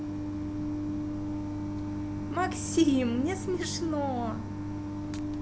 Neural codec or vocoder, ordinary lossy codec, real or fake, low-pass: none; none; real; none